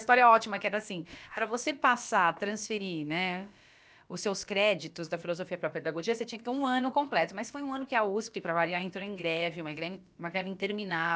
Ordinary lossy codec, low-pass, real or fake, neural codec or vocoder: none; none; fake; codec, 16 kHz, about 1 kbps, DyCAST, with the encoder's durations